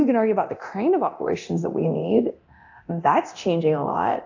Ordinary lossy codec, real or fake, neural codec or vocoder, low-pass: AAC, 48 kbps; fake; codec, 24 kHz, 0.9 kbps, DualCodec; 7.2 kHz